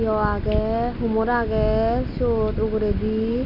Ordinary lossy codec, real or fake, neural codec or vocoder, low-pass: none; real; none; 5.4 kHz